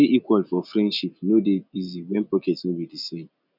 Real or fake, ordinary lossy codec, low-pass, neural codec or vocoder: real; none; 5.4 kHz; none